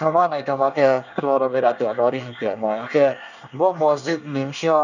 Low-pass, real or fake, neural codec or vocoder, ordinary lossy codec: 7.2 kHz; fake; codec, 24 kHz, 1 kbps, SNAC; none